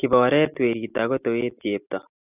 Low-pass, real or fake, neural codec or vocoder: 3.6 kHz; real; none